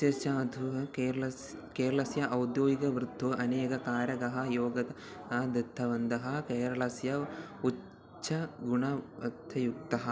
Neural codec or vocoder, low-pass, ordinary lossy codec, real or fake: none; none; none; real